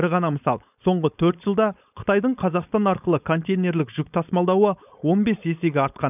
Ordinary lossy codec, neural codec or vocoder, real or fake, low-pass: none; codec, 16 kHz, 4.8 kbps, FACodec; fake; 3.6 kHz